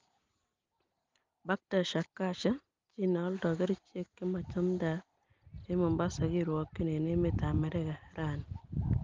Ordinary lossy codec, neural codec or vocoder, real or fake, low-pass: Opus, 32 kbps; none; real; 7.2 kHz